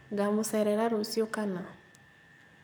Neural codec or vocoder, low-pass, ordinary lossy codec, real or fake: codec, 44.1 kHz, 7.8 kbps, Pupu-Codec; none; none; fake